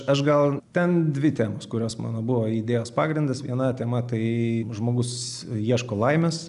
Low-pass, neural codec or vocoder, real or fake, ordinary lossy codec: 10.8 kHz; none; real; AAC, 96 kbps